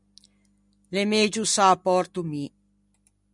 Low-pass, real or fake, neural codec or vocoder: 10.8 kHz; real; none